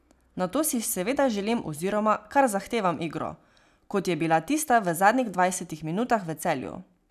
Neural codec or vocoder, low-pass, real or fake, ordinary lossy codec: none; 14.4 kHz; real; none